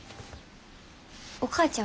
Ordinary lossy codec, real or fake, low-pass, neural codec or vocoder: none; real; none; none